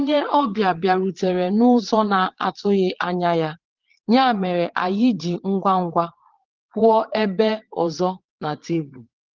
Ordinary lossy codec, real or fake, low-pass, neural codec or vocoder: Opus, 16 kbps; fake; 7.2 kHz; vocoder, 22.05 kHz, 80 mel bands, Vocos